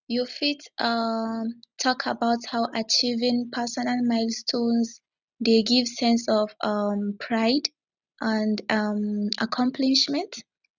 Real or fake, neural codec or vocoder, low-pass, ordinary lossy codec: real; none; 7.2 kHz; none